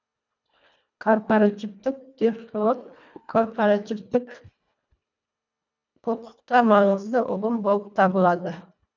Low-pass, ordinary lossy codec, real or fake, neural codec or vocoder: 7.2 kHz; none; fake; codec, 24 kHz, 1.5 kbps, HILCodec